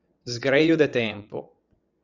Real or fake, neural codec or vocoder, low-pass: fake; vocoder, 22.05 kHz, 80 mel bands, WaveNeXt; 7.2 kHz